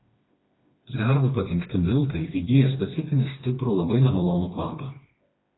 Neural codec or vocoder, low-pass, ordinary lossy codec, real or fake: codec, 16 kHz, 2 kbps, FreqCodec, smaller model; 7.2 kHz; AAC, 16 kbps; fake